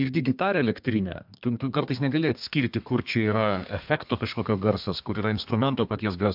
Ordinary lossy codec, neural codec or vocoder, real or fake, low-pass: MP3, 48 kbps; codec, 32 kHz, 1.9 kbps, SNAC; fake; 5.4 kHz